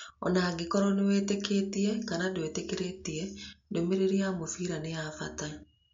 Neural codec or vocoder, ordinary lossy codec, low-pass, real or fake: none; MP3, 48 kbps; 7.2 kHz; real